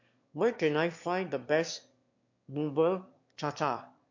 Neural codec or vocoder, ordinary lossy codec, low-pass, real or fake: autoencoder, 22.05 kHz, a latent of 192 numbers a frame, VITS, trained on one speaker; MP3, 48 kbps; 7.2 kHz; fake